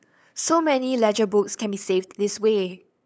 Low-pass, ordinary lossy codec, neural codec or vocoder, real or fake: none; none; codec, 16 kHz, 8 kbps, FunCodec, trained on LibriTTS, 25 frames a second; fake